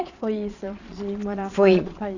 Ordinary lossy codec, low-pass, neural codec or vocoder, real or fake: none; 7.2 kHz; vocoder, 22.05 kHz, 80 mel bands, Vocos; fake